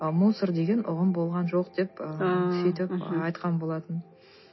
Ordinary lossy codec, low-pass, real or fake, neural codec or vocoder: MP3, 24 kbps; 7.2 kHz; real; none